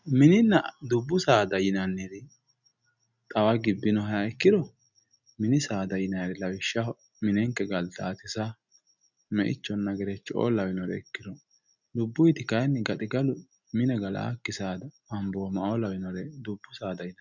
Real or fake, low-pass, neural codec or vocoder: real; 7.2 kHz; none